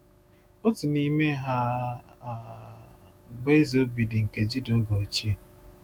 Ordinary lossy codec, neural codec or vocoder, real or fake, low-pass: none; autoencoder, 48 kHz, 128 numbers a frame, DAC-VAE, trained on Japanese speech; fake; none